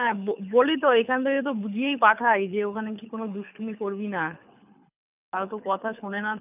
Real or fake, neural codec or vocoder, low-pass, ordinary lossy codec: fake; codec, 24 kHz, 6 kbps, HILCodec; 3.6 kHz; none